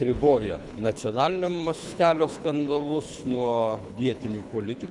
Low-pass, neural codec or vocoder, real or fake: 10.8 kHz; codec, 24 kHz, 3 kbps, HILCodec; fake